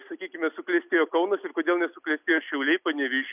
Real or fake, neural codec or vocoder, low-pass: real; none; 3.6 kHz